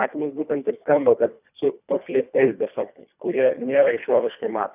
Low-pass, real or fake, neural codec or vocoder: 3.6 kHz; fake; codec, 24 kHz, 1.5 kbps, HILCodec